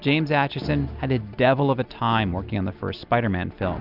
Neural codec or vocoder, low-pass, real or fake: none; 5.4 kHz; real